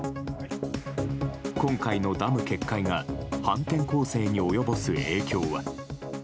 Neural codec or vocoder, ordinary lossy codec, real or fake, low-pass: none; none; real; none